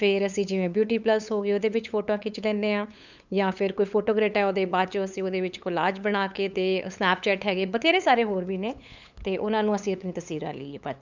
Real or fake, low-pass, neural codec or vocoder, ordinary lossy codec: fake; 7.2 kHz; codec, 16 kHz, 8 kbps, FunCodec, trained on LibriTTS, 25 frames a second; none